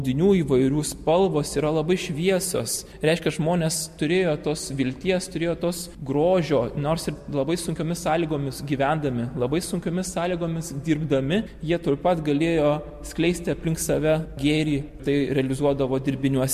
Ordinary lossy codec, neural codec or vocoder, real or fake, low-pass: MP3, 64 kbps; none; real; 14.4 kHz